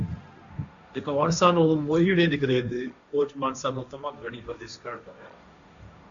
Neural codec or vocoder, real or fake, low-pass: codec, 16 kHz, 1.1 kbps, Voila-Tokenizer; fake; 7.2 kHz